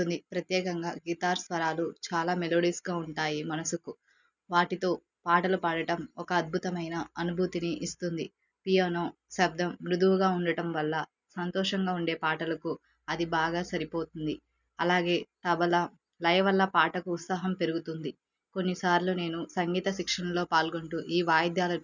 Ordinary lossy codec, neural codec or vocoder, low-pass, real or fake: none; none; 7.2 kHz; real